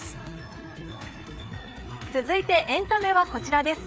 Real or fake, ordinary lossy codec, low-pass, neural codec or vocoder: fake; none; none; codec, 16 kHz, 4 kbps, FreqCodec, larger model